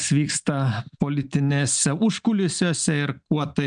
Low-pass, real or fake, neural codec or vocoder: 9.9 kHz; real; none